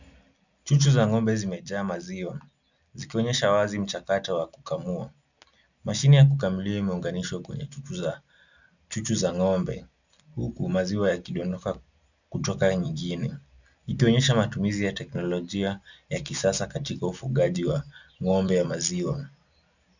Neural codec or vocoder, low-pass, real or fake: none; 7.2 kHz; real